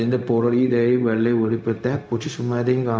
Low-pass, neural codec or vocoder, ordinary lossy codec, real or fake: none; codec, 16 kHz, 0.4 kbps, LongCat-Audio-Codec; none; fake